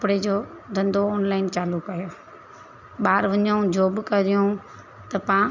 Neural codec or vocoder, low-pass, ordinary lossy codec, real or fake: none; 7.2 kHz; none; real